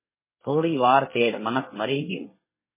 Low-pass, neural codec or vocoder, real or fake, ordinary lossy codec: 3.6 kHz; codec, 24 kHz, 1 kbps, SNAC; fake; MP3, 16 kbps